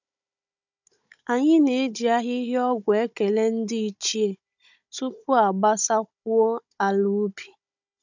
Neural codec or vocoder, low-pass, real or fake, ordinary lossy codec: codec, 16 kHz, 16 kbps, FunCodec, trained on Chinese and English, 50 frames a second; 7.2 kHz; fake; none